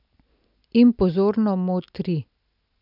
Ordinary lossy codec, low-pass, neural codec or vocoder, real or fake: none; 5.4 kHz; none; real